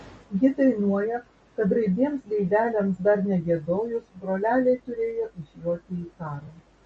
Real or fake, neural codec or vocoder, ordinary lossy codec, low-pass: real; none; MP3, 32 kbps; 10.8 kHz